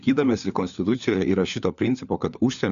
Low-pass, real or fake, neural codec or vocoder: 7.2 kHz; fake; codec, 16 kHz, 4 kbps, FunCodec, trained on LibriTTS, 50 frames a second